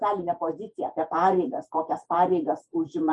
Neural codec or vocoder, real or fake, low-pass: vocoder, 48 kHz, 128 mel bands, Vocos; fake; 10.8 kHz